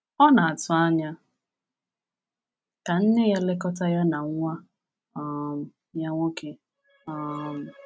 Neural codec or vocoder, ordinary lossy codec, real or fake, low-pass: none; none; real; none